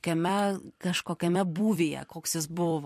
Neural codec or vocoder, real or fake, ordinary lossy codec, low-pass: vocoder, 44.1 kHz, 128 mel bands every 256 samples, BigVGAN v2; fake; MP3, 64 kbps; 14.4 kHz